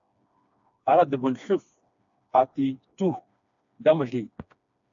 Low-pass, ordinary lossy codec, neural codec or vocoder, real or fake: 7.2 kHz; AAC, 64 kbps; codec, 16 kHz, 2 kbps, FreqCodec, smaller model; fake